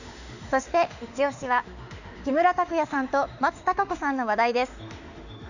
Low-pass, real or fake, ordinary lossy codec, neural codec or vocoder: 7.2 kHz; fake; none; autoencoder, 48 kHz, 32 numbers a frame, DAC-VAE, trained on Japanese speech